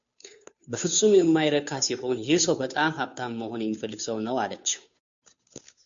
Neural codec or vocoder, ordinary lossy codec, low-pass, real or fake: codec, 16 kHz, 2 kbps, FunCodec, trained on Chinese and English, 25 frames a second; MP3, 64 kbps; 7.2 kHz; fake